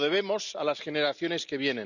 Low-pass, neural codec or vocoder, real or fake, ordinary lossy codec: 7.2 kHz; none; real; none